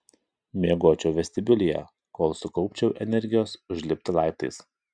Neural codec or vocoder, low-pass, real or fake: none; 9.9 kHz; real